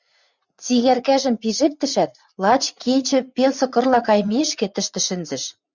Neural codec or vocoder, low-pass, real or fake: vocoder, 44.1 kHz, 80 mel bands, Vocos; 7.2 kHz; fake